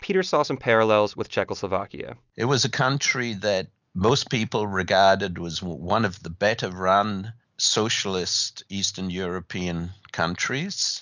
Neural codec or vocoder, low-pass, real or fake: none; 7.2 kHz; real